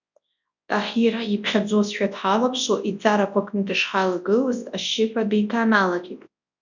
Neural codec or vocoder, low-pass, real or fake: codec, 24 kHz, 0.9 kbps, WavTokenizer, large speech release; 7.2 kHz; fake